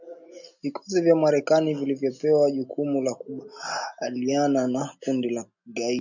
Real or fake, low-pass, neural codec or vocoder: real; 7.2 kHz; none